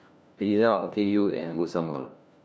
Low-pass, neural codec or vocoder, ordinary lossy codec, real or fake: none; codec, 16 kHz, 1 kbps, FunCodec, trained on LibriTTS, 50 frames a second; none; fake